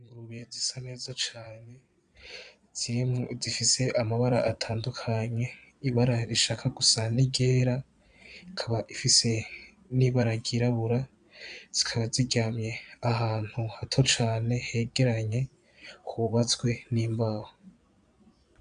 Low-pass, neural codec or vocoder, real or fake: 9.9 kHz; vocoder, 22.05 kHz, 80 mel bands, Vocos; fake